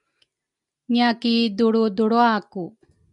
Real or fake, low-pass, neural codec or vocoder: real; 10.8 kHz; none